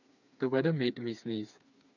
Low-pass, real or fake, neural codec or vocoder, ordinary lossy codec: 7.2 kHz; fake; codec, 16 kHz, 4 kbps, FreqCodec, smaller model; none